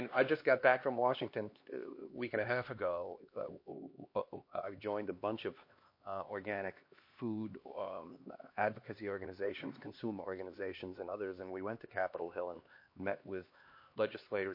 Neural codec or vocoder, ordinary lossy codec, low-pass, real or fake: codec, 16 kHz, 2 kbps, X-Codec, HuBERT features, trained on LibriSpeech; MP3, 32 kbps; 5.4 kHz; fake